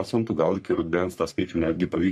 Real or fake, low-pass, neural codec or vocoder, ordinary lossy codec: fake; 14.4 kHz; codec, 44.1 kHz, 3.4 kbps, Pupu-Codec; MP3, 64 kbps